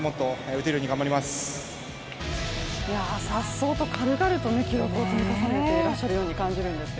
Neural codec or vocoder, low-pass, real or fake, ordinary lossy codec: none; none; real; none